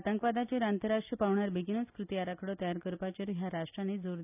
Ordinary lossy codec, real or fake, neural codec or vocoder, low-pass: none; real; none; 3.6 kHz